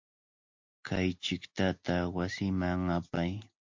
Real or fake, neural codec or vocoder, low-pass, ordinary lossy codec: real; none; 7.2 kHz; MP3, 48 kbps